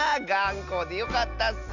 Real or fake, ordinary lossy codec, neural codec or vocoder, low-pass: real; none; none; 7.2 kHz